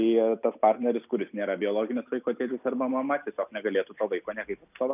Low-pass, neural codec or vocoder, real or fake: 3.6 kHz; none; real